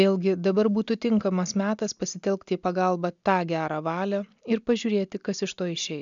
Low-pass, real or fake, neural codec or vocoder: 7.2 kHz; real; none